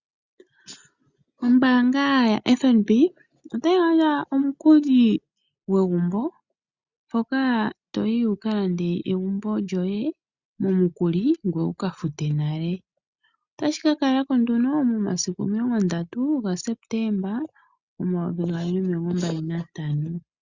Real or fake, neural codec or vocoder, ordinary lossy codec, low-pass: real; none; Opus, 64 kbps; 7.2 kHz